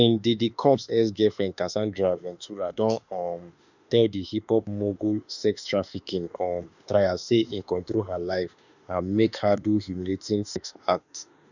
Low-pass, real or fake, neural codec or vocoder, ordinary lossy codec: 7.2 kHz; fake; autoencoder, 48 kHz, 32 numbers a frame, DAC-VAE, trained on Japanese speech; none